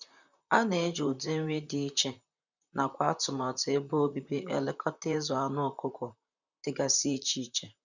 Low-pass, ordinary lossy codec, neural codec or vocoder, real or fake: 7.2 kHz; none; vocoder, 44.1 kHz, 128 mel bands, Pupu-Vocoder; fake